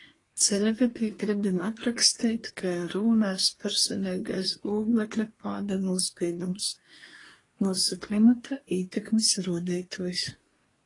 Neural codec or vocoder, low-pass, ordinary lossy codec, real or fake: codec, 24 kHz, 1 kbps, SNAC; 10.8 kHz; AAC, 32 kbps; fake